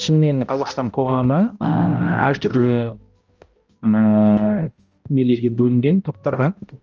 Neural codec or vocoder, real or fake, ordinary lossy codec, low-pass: codec, 16 kHz, 0.5 kbps, X-Codec, HuBERT features, trained on balanced general audio; fake; Opus, 24 kbps; 7.2 kHz